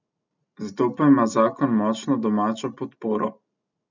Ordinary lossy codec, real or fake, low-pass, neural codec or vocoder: none; real; 7.2 kHz; none